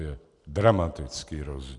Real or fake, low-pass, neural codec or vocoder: real; 10.8 kHz; none